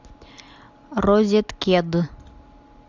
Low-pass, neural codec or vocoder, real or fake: 7.2 kHz; none; real